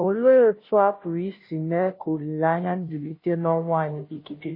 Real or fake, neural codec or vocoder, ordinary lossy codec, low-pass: fake; codec, 16 kHz, 0.5 kbps, FunCodec, trained on Chinese and English, 25 frames a second; MP3, 24 kbps; 5.4 kHz